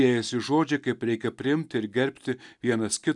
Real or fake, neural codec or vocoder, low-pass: real; none; 10.8 kHz